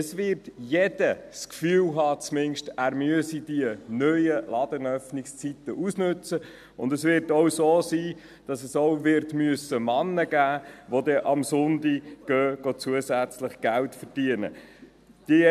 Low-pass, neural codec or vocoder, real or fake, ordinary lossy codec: 14.4 kHz; none; real; AAC, 96 kbps